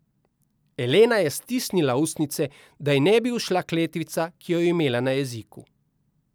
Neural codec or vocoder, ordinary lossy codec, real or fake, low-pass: none; none; real; none